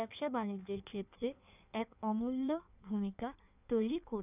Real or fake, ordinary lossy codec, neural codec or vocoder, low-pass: fake; none; codec, 16 kHz in and 24 kHz out, 1.1 kbps, FireRedTTS-2 codec; 3.6 kHz